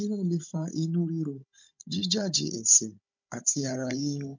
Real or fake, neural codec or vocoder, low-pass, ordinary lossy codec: fake; codec, 16 kHz, 16 kbps, FunCodec, trained on Chinese and English, 50 frames a second; 7.2 kHz; MP3, 48 kbps